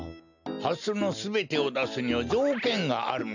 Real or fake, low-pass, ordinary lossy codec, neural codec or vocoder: real; 7.2 kHz; none; none